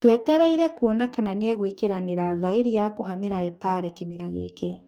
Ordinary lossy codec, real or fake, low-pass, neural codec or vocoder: none; fake; 19.8 kHz; codec, 44.1 kHz, 2.6 kbps, DAC